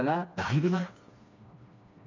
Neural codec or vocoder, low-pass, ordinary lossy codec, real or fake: codec, 16 kHz, 2 kbps, FreqCodec, smaller model; 7.2 kHz; MP3, 64 kbps; fake